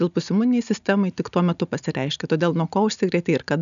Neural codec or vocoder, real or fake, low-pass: none; real; 7.2 kHz